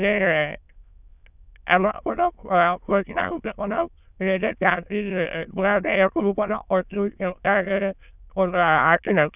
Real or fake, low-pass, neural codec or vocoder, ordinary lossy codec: fake; 3.6 kHz; autoencoder, 22.05 kHz, a latent of 192 numbers a frame, VITS, trained on many speakers; none